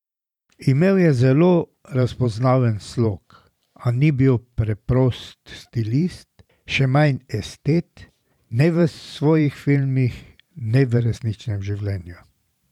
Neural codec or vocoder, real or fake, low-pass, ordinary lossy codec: vocoder, 44.1 kHz, 128 mel bands every 512 samples, BigVGAN v2; fake; 19.8 kHz; none